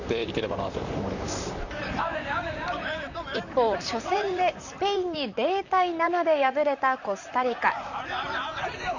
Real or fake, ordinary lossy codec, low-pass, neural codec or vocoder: fake; none; 7.2 kHz; vocoder, 44.1 kHz, 128 mel bands, Pupu-Vocoder